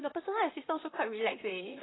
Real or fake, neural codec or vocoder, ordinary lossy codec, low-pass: fake; vocoder, 44.1 kHz, 128 mel bands, Pupu-Vocoder; AAC, 16 kbps; 7.2 kHz